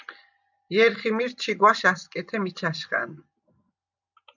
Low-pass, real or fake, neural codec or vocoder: 7.2 kHz; real; none